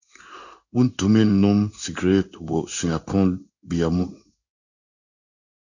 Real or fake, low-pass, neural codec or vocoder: fake; 7.2 kHz; codec, 16 kHz in and 24 kHz out, 1 kbps, XY-Tokenizer